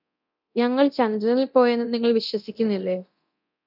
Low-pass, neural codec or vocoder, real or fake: 5.4 kHz; codec, 24 kHz, 0.9 kbps, DualCodec; fake